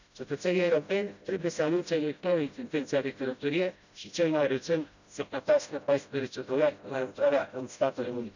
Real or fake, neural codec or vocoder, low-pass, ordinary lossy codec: fake; codec, 16 kHz, 0.5 kbps, FreqCodec, smaller model; 7.2 kHz; none